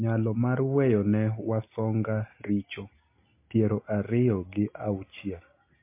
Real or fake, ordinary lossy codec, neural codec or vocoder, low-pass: real; MP3, 24 kbps; none; 3.6 kHz